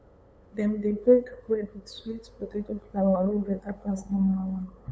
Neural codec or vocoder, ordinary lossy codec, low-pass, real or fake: codec, 16 kHz, 8 kbps, FunCodec, trained on LibriTTS, 25 frames a second; none; none; fake